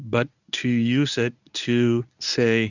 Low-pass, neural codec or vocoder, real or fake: 7.2 kHz; codec, 24 kHz, 0.9 kbps, WavTokenizer, medium speech release version 1; fake